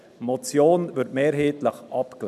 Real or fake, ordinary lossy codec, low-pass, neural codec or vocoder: real; none; 14.4 kHz; none